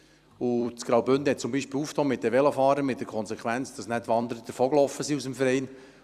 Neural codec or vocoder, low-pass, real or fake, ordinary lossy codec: vocoder, 44.1 kHz, 128 mel bands every 256 samples, BigVGAN v2; 14.4 kHz; fake; Opus, 64 kbps